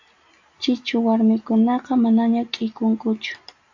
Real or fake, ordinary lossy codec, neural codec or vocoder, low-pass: real; AAC, 48 kbps; none; 7.2 kHz